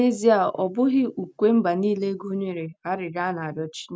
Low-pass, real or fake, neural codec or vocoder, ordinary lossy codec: none; real; none; none